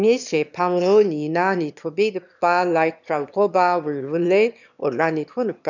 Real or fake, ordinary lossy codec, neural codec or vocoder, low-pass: fake; none; autoencoder, 22.05 kHz, a latent of 192 numbers a frame, VITS, trained on one speaker; 7.2 kHz